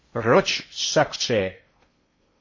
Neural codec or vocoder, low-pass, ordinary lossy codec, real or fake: codec, 16 kHz in and 24 kHz out, 0.6 kbps, FocalCodec, streaming, 4096 codes; 7.2 kHz; MP3, 32 kbps; fake